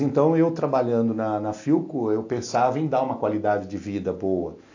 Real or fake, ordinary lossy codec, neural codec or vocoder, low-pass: real; AAC, 48 kbps; none; 7.2 kHz